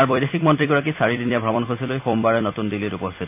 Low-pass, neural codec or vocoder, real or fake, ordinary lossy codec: 3.6 kHz; vocoder, 44.1 kHz, 128 mel bands every 256 samples, BigVGAN v2; fake; none